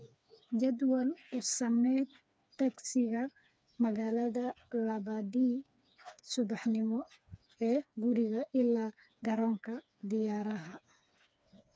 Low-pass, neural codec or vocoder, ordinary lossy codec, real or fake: none; codec, 16 kHz, 4 kbps, FreqCodec, smaller model; none; fake